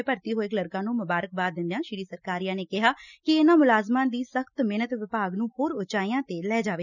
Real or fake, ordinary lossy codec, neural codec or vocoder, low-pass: real; none; none; 7.2 kHz